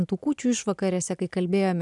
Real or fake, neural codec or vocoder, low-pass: real; none; 10.8 kHz